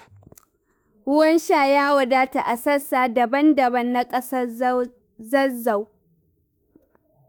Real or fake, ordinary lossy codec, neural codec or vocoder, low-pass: fake; none; autoencoder, 48 kHz, 32 numbers a frame, DAC-VAE, trained on Japanese speech; none